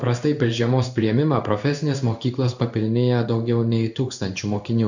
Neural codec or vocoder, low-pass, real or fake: codec, 16 kHz in and 24 kHz out, 1 kbps, XY-Tokenizer; 7.2 kHz; fake